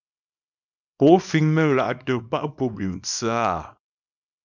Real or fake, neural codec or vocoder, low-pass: fake; codec, 24 kHz, 0.9 kbps, WavTokenizer, small release; 7.2 kHz